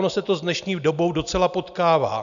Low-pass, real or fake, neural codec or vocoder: 7.2 kHz; real; none